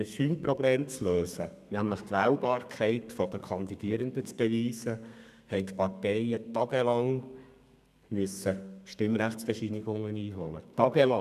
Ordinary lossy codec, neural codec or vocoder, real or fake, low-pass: none; codec, 32 kHz, 1.9 kbps, SNAC; fake; 14.4 kHz